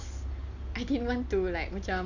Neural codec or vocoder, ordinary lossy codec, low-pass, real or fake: none; none; 7.2 kHz; real